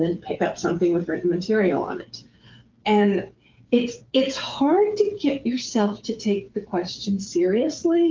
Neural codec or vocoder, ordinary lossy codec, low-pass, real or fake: codec, 16 kHz, 8 kbps, FreqCodec, smaller model; Opus, 32 kbps; 7.2 kHz; fake